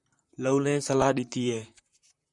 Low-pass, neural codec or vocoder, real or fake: 10.8 kHz; vocoder, 44.1 kHz, 128 mel bands, Pupu-Vocoder; fake